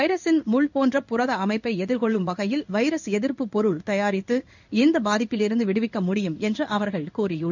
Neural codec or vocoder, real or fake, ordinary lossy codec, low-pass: codec, 16 kHz in and 24 kHz out, 1 kbps, XY-Tokenizer; fake; none; 7.2 kHz